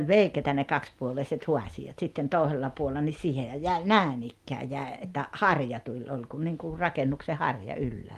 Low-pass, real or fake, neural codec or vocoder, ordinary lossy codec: 14.4 kHz; real; none; Opus, 32 kbps